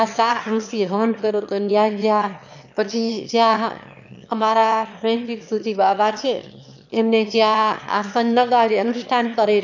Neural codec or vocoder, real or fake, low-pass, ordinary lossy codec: autoencoder, 22.05 kHz, a latent of 192 numbers a frame, VITS, trained on one speaker; fake; 7.2 kHz; none